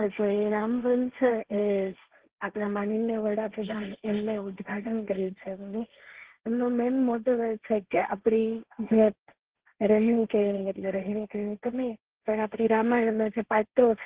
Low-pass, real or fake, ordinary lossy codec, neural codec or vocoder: 3.6 kHz; fake; Opus, 16 kbps; codec, 16 kHz, 1.1 kbps, Voila-Tokenizer